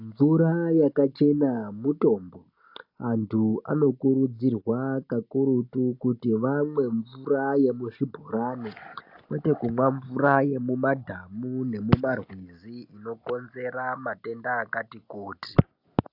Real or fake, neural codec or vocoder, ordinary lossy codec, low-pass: real; none; AAC, 48 kbps; 5.4 kHz